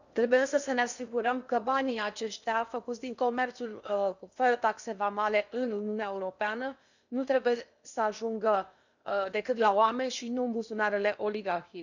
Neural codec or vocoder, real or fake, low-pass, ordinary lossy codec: codec, 16 kHz in and 24 kHz out, 0.8 kbps, FocalCodec, streaming, 65536 codes; fake; 7.2 kHz; none